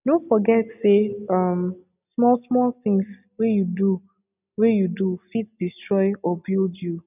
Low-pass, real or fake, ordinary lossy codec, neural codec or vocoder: 3.6 kHz; real; none; none